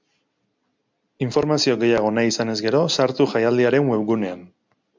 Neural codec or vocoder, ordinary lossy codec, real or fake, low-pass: none; MP3, 64 kbps; real; 7.2 kHz